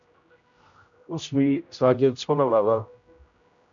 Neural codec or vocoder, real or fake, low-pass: codec, 16 kHz, 0.5 kbps, X-Codec, HuBERT features, trained on general audio; fake; 7.2 kHz